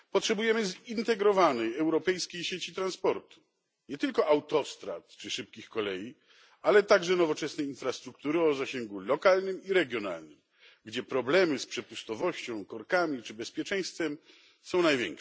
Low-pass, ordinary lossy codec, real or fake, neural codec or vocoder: none; none; real; none